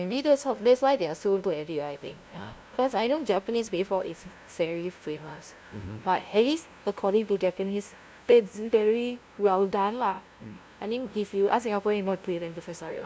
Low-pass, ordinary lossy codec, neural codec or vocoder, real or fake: none; none; codec, 16 kHz, 0.5 kbps, FunCodec, trained on LibriTTS, 25 frames a second; fake